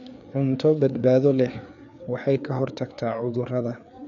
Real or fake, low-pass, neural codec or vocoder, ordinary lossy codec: fake; 7.2 kHz; codec, 16 kHz, 4 kbps, FreqCodec, larger model; none